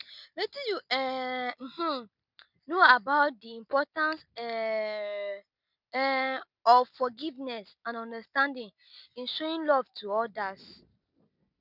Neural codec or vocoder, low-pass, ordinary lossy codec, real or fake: none; 5.4 kHz; none; real